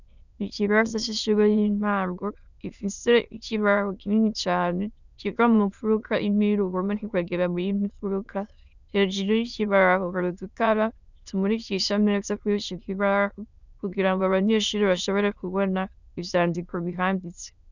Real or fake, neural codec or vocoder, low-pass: fake; autoencoder, 22.05 kHz, a latent of 192 numbers a frame, VITS, trained on many speakers; 7.2 kHz